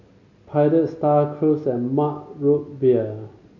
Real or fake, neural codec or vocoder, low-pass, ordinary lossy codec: real; none; 7.2 kHz; none